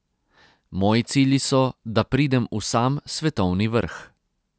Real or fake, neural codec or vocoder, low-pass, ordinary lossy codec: real; none; none; none